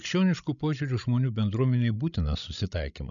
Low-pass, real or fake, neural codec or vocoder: 7.2 kHz; fake; codec, 16 kHz, 16 kbps, FreqCodec, larger model